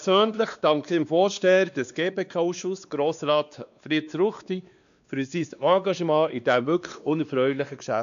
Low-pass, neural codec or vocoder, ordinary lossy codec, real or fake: 7.2 kHz; codec, 16 kHz, 2 kbps, X-Codec, WavLM features, trained on Multilingual LibriSpeech; none; fake